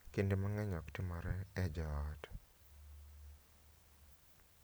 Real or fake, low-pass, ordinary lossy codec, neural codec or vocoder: real; none; none; none